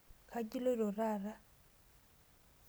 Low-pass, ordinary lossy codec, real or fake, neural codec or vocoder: none; none; real; none